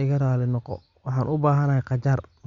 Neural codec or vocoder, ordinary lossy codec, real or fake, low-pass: none; Opus, 64 kbps; real; 7.2 kHz